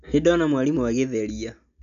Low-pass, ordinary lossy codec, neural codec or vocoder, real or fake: 7.2 kHz; none; none; real